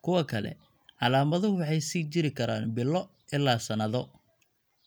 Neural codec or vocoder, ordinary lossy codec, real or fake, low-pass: none; none; real; none